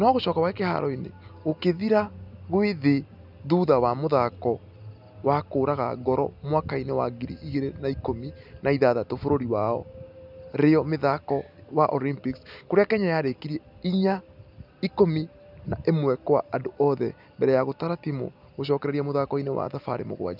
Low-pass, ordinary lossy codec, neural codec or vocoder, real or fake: 5.4 kHz; none; none; real